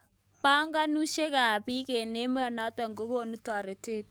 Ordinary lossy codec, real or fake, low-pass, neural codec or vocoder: none; fake; none; codec, 44.1 kHz, 7.8 kbps, DAC